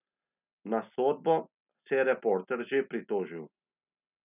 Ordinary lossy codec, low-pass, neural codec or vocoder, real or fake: none; 3.6 kHz; none; real